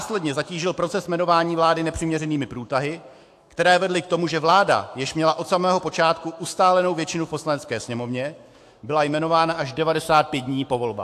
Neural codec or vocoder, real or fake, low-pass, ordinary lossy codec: autoencoder, 48 kHz, 128 numbers a frame, DAC-VAE, trained on Japanese speech; fake; 14.4 kHz; AAC, 64 kbps